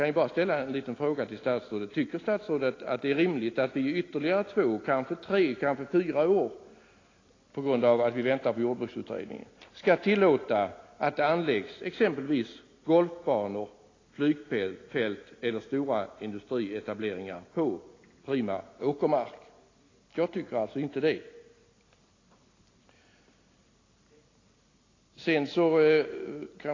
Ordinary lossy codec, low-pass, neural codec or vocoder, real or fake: AAC, 32 kbps; 7.2 kHz; none; real